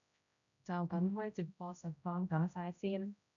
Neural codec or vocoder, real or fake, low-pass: codec, 16 kHz, 0.5 kbps, X-Codec, HuBERT features, trained on general audio; fake; 7.2 kHz